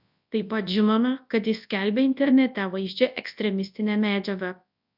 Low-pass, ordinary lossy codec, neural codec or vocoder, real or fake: 5.4 kHz; AAC, 48 kbps; codec, 24 kHz, 0.9 kbps, WavTokenizer, large speech release; fake